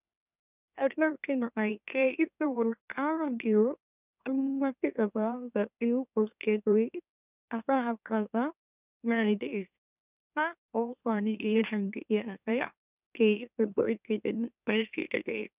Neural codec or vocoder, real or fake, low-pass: autoencoder, 44.1 kHz, a latent of 192 numbers a frame, MeloTTS; fake; 3.6 kHz